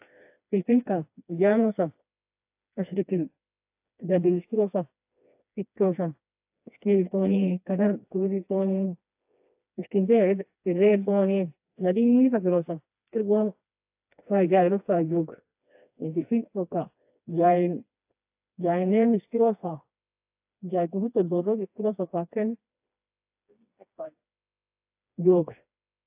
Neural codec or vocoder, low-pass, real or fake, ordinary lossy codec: codec, 16 kHz, 2 kbps, FreqCodec, smaller model; 3.6 kHz; fake; AAC, 32 kbps